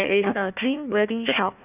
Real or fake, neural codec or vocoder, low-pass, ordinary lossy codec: fake; codec, 16 kHz, 1 kbps, FunCodec, trained on Chinese and English, 50 frames a second; 3.6 kHz; none